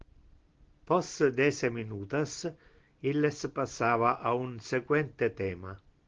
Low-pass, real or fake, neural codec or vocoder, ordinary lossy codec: 7.2 kHz; real; none; Opus, 16 kbps